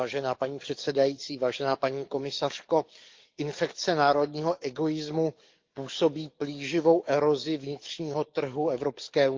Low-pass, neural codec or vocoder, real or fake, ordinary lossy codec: 7.2 kHz; codec, 44.1 kHz, 7.8 kbps, DAC; fake; Opus, 24 kbps